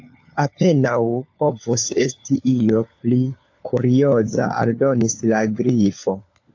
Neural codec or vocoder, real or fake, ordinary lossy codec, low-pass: codec, 16 kHz, 4 kbps, FunCodec, trained on LibriTTS, 50 frames a second; fake; AAC, 48 kbps; 7.2 kHz